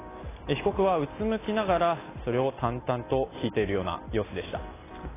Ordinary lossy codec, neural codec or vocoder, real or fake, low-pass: AAC, 16 kbps; none; real; 3.6 kHz